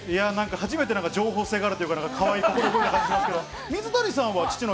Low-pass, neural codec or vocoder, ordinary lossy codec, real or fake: none; none; none; real